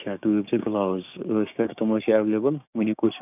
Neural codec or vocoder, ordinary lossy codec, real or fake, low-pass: codec, 16 kHz, 6 kbps, DAC; none; fake; 3.6 kHz